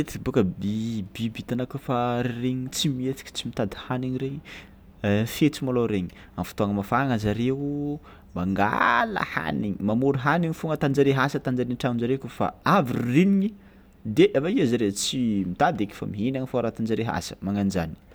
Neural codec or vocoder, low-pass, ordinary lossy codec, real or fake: none; none; none; real